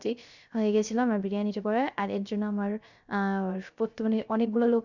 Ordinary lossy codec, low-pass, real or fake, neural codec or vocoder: none; 7.2 kHz; fake; codec, 16 kHz, 0.3 kbps, FocalCodec